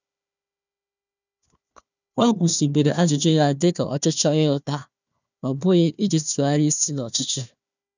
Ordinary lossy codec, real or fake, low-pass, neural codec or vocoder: none; fake; 7.2 kHz; codec, 16 kHz, 1 kbps, FunCodec, trained on Chinese and English, 50 frames a second